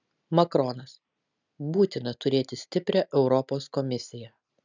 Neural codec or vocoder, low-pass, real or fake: none; 7.2 kHz; real